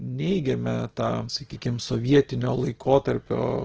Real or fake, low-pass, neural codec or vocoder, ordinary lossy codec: real; 7.2 kHz; none; Opus, 16 kbps